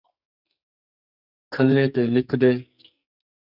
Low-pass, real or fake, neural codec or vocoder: 5.4 kHz; fake; codec, 44.1 kHz, 2.6 kbps, SNAC